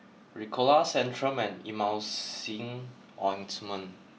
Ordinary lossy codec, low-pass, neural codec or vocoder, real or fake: none; none; none; real